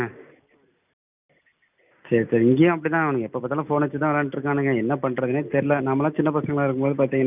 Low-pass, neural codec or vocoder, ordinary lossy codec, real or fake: 3.6 kHz; none; none; real